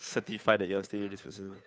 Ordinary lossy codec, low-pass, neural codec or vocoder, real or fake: none; none; codec, 16 kHz, 2 kbps, FunCodec, trained on Chinese and English, 25 frames a second; fake